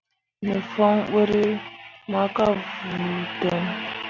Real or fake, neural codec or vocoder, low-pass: real; none; 7.2 kHz